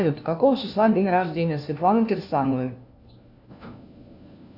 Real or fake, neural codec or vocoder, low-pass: fake; codec, 16 kHz, 1 kbps, FunCodec, trained on LibriTTS, 50 frames a second; 5.4 kHz